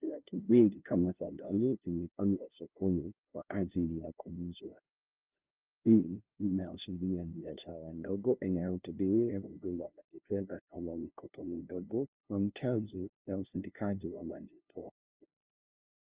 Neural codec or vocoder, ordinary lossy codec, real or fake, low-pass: codec, 16 kHz, 0.5 kbps, FunCodec, trained on LibriTTS, 25 frames a second; Opus, 32 kbps; fake; 3.6 kHz